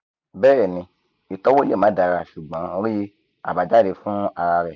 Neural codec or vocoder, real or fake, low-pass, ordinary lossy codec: none; real; 7.2 kHz; none